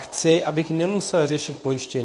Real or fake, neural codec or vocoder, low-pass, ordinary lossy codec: fake; codec, 24 kHz, 0.9 kbps, WavTokenizer, medium speech release version 1; 10.8 kHz; MP3, 96 kbps